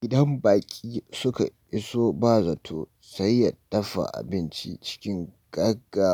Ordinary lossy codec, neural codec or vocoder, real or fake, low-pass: none; none; real; none